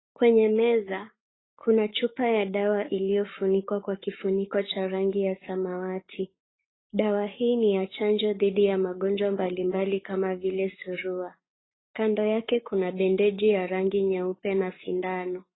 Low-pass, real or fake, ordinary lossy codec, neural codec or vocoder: 7.2 kHz; fake; AAC, 16 kbps; codec, 44.1 kHz, 7.8 kbps, Pupu-Codec